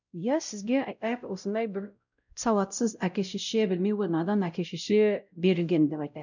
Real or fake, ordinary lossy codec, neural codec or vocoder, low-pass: fake; none; codec, 16 kHz, 0.5 kbps, X-Codec, WavLM features, trained on Multilingual LibriSpeech; 7.2 kHz